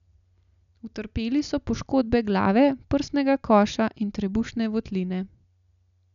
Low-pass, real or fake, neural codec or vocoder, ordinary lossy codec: 7.2 kHz; real; none; none